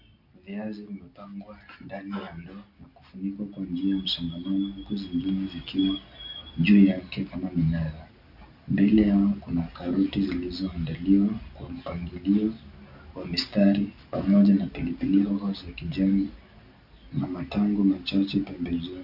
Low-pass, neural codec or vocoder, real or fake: 5.4 kHz; none; real